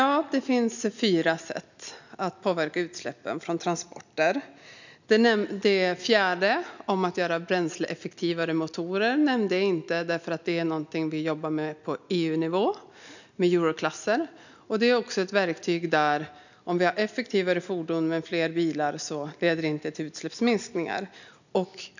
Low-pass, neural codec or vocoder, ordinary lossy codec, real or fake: 7.2 kHz; none; none; real